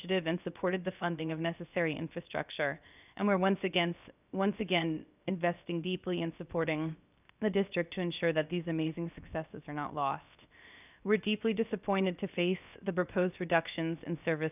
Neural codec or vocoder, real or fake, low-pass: codec, 16 kHz, 0.7 kbps, FocalCodec; fake; 3.6 kHz